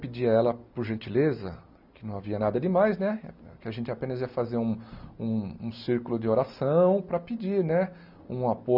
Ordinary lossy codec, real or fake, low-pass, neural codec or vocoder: none; real; 5.4 kHz; none